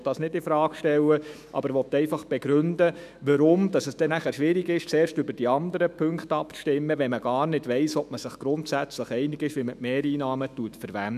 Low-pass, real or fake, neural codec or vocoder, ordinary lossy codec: 14.4 kHz; fake; autoencoder, 48 kHz, 128 numbers a frame, DAC-VAE, trained on Japanese speech; none